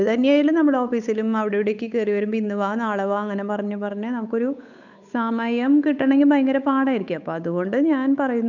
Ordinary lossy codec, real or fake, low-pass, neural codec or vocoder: none; fake; 7.2 kHz; codec, 16 kHz, 8 kbps, FunCodec, trained on Chinese and English, 25 frames a second